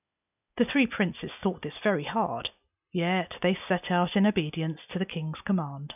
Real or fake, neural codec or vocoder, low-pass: real; none; 3.6 kHz